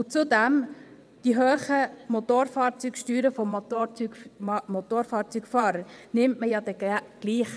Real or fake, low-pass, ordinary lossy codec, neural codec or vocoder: fake; none; none; vocoder, 22.05 kHz, 80 mel bands, WaveNeXt